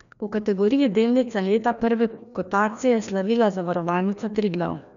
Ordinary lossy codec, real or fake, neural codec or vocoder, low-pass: none; fake; codec, 16 kHz, 1 kbps, FreqCodec, larger model; 7.2 kHz